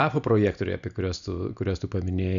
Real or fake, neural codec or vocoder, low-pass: real; none; 7.2 kHz